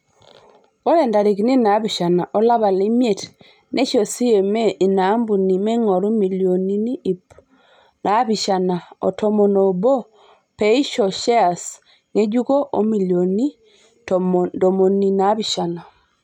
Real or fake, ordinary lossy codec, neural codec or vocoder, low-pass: real; none; none; 19.8 kHz